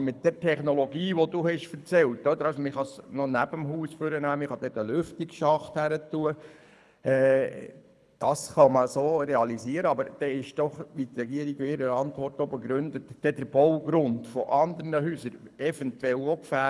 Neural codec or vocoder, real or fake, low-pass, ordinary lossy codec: codec, 24 kHz, 6 kbps, HILCodec; fake; none; none